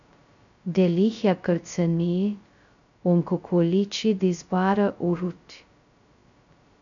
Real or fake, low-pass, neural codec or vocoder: fake; 7.2 kHz; codec, 16 kHz, 0.2 kbps, FocalCodec